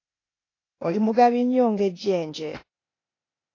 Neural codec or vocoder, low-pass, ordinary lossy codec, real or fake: codec, 16 kHz, 0.8 kbps, ZipCodec; 7.2 kHz; AAC, 32 kbps; fake